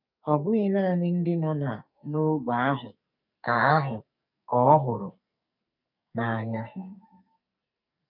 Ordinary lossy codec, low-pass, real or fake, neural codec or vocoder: none; 5.4 kHz; fake; codec, 44.1 kHz, 2.6 kbps, SNAC